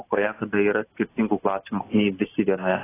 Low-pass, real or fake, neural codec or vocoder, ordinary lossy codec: 3.6 kHz; real; none; AAC, 16 kbps